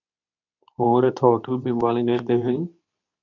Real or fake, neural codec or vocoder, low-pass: fake; codec, 24 kHz, 0.9 kbps, WavTokenizer, medium speech release version 2; 7.2 kHz